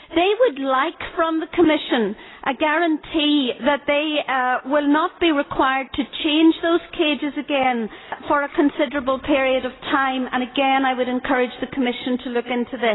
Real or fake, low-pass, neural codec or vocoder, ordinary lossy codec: real; 7.2 kHz; none; AAC, 16 kbps